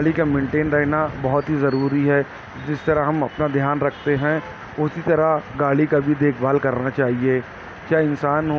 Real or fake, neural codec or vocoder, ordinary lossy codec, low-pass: real; none; none; none